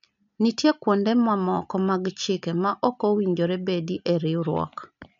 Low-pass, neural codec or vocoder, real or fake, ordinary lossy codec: 7.2 kHz; none; real; MP3, 64 kbps